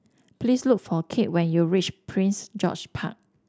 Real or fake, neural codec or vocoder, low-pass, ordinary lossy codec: real; none; none; none